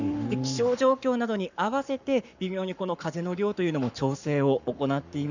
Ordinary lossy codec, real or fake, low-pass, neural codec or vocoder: none; fake; 7.2 kHz; codec, 44.1 kHz, 7.8 kbps, Pupu-Codec